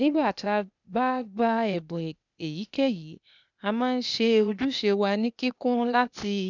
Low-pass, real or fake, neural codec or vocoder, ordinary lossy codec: 7.2 kHz; fake; codec, 16 kHz, 0.8 kbps, ZipCodec; none